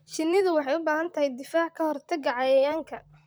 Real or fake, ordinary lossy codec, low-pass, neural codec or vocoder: fake; none; none; vocoder, 44.1 kHz, 128 mel bands, Pupu-Vocoder